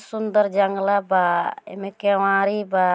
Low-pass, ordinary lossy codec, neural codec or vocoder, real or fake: none; none; none; real